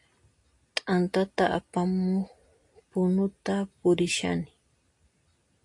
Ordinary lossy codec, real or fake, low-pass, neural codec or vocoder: AAC, 48 kbps; real; 10.8 kHz; none